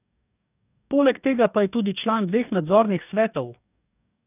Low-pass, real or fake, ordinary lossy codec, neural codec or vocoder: 3.6 kHz; fake; none; codec, 44.1 kHz, 2.6 kbps, DAC